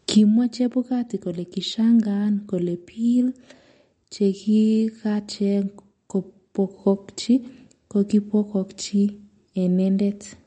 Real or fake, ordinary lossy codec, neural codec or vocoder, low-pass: real; MP3, 48 kbps; none; 9.9 kHz